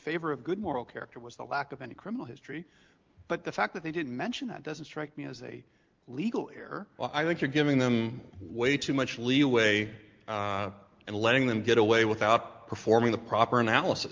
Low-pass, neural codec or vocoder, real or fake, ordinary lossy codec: 7.2 kHz; none; real; Opus, 32 kbps